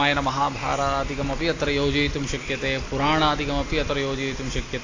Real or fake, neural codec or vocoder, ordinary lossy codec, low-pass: real; none; AAC, 32 kbps; 7.2 kHz